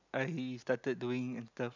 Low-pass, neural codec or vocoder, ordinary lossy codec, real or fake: 7.2 kHz; none; none; real